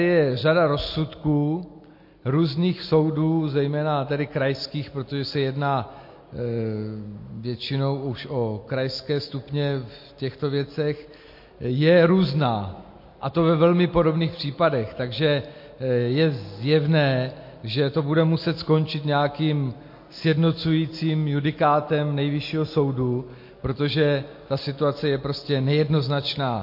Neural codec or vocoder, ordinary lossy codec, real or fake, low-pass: none; MP3, 32 kbps; real; 5.4 kHz